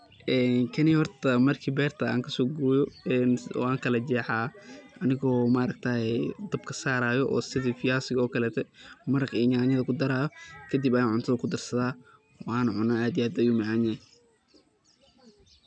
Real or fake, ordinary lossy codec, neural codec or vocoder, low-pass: real; none; none; 9.9 kHz